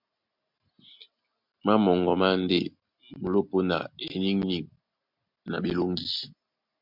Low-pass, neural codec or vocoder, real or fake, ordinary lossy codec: 5.4 kHz; none; real; MP3, 48 kbps